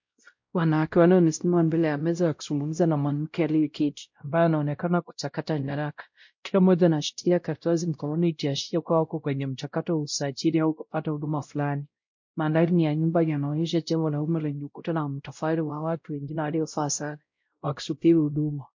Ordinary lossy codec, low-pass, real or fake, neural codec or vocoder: MP3, 48 kbps; 7.2 kHz; fake; codec, 16 kHz, 0.5 kbps, X-Codec, WavLM features, trained on Multilingual LibriSpeech